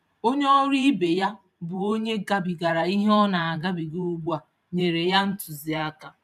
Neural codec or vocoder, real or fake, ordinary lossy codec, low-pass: vocoder, 44.1 kHz, 128 mel bands every 512 samples, BigVGAN v2; fake; none; 14.4 kHz